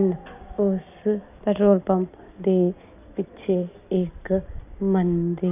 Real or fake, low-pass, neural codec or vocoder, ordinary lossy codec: real; 3.6 kHz; none; none